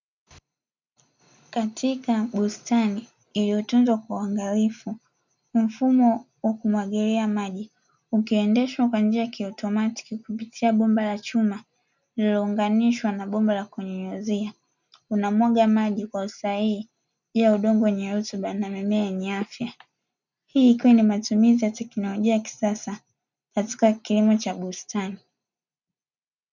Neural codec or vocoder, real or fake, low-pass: none; real; 7.2 kHz